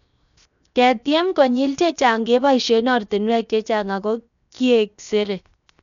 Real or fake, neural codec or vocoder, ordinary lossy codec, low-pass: fake; codec, 16 kHz, 0.7 kbps, FocalCodec; none; 7.2 kHz